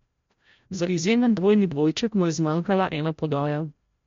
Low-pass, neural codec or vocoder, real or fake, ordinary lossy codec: 7.2 kHz; codec, 16 kHz, 0.5 kbps, FreqCodec, larger model; fake; MP3, 48 kbps